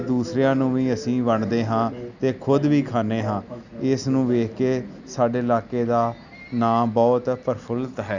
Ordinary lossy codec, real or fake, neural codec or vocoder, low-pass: none; real; none; 7.2 kHz